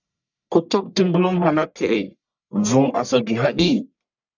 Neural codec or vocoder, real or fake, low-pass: codec, 44.1 kHz, 1.7 kbps, Pupu-Codec; fake; 7.2 kHz